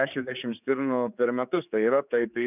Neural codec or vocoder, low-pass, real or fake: codec, 16 kHz, 4 kbps, X-Codec, HuBERT features, trained on general audio; 3.6 kHz; fake